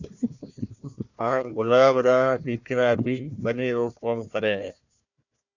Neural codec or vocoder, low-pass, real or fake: codec, 16 kHz, 1 kbps, FunCodec, trained on Chinese and English, 50 frames a second; 7.2 kHz; fake